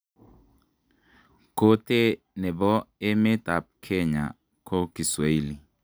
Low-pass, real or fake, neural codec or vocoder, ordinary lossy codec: none; real; none; none